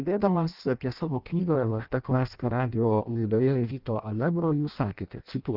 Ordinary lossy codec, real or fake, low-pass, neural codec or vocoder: Opus, 32 kbps; fake; 5.4 kHz; codec, 16 kHz in and 24 kHz out, 0.6 kbps, FireRedTTS-2 codec